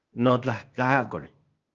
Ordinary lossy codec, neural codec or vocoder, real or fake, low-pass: Opus, 24 kbps; codec, 16 kHz, 0.8 kbps, ZipCodec; fake; 7.2 kHz